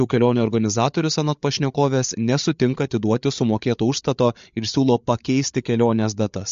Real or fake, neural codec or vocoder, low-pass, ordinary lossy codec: fake; codec, 16 kHz, 8 kbps, FreqCodec, larger model; 7.2 kHz; MP3, 48 kbps